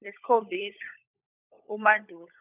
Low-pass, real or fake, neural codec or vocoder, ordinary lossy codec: 3.6 kHz; fake; codec, 16 kHz, 16 kbps, FunCodec, trained on LibriTTS, 50 frames a second; none